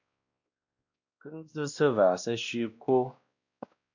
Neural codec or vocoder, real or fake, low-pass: codec, 16 kHz, 1 kbps, X-Codec, WavLM features, trained on Multilingual LibriSpeech; fake; 7.2 kHz